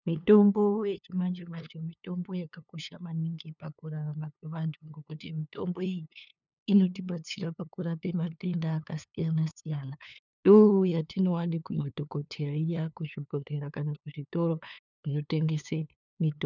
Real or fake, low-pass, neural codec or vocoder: fake; 7.2 kHz; codec, 16 kHz, 2 kbps, FunCodec, trained on LibriTTS, 25 frames a second